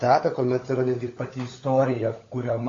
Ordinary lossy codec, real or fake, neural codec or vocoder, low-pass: AAC, 32 kbps; fake; codec, 16 kHz, 16 kbps, FunCodec, trained on LibriTTS, 50 frames a second; 7.2 kHz